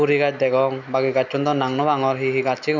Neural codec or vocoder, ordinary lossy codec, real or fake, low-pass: none; none; real; 7.2 kHz